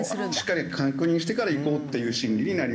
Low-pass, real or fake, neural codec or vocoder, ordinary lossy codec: none; real; none; none